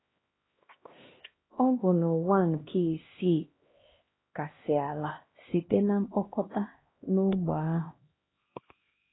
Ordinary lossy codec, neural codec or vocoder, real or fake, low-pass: AAC, 16 kbps; codec, 16 kHz, 1 kbps, X-Codec, HuBERT features, trained on LibriSpeech; fake; 7.2 kHz